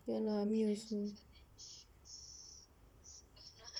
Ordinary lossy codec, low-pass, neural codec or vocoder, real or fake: none; 19.8 kHz; vocoder, 44.1 kHz, 128 mel bands, Pupu-Vocoder; fake